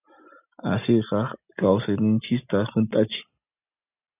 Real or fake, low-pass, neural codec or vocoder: real; 3.6 kHz; none